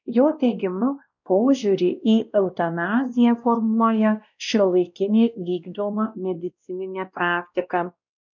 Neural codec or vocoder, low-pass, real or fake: codec, 16 kHz, 1 kbps, X-Codec, WavLM features, trained on Multilingual LibriSpeech; 7.2 kHz; fake